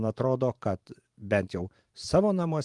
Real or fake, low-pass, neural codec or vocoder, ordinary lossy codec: fake; 10.8 kHz; vocoder, 44.1 kHz, 128 mel bands every 512 samples, BigVGAN v2; Opus, 24 kbps